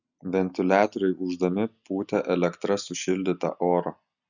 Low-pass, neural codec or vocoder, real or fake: 7.2 kHz; none; real